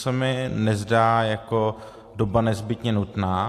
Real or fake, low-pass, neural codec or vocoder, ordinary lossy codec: real; 14.4 kHz; none; AAC, 64 kbps